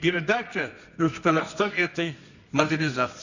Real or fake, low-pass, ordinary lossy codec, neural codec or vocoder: fake; 7.2 kHz; none; codec, 24 kHz, 0.9 kbps, WavTokenizer, medium music audio release